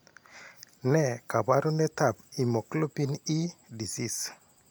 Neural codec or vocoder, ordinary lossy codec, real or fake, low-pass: none; none; real; none